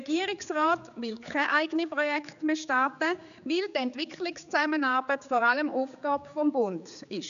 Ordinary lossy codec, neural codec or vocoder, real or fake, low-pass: none; codec, 16 kHz, 4 kbps, X-Codec, HuBERT features, trained on general audio; fake; 7.2 kHz